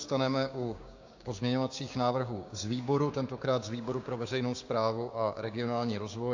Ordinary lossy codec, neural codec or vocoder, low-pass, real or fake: AAC, 48 kbps; codec, 16 kHz, 6 kbps, DAC; 7.2 kHz; fake